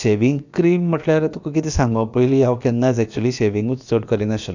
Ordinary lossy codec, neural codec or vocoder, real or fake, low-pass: none; codec, 16 kHz, about 1 kbps, DyCAST, with the encoder's durations; fake; 7.2 kHz